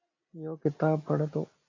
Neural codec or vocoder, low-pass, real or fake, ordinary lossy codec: none; 7.2 kHz; real; AAC, 32 kbps